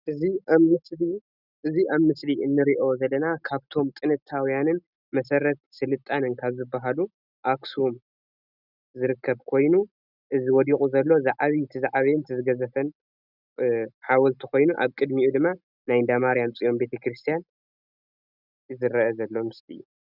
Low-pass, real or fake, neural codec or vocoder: 5.4 kHz; real; none